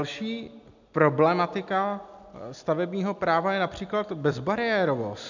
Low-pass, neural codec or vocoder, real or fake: 7.2 kHz; none; real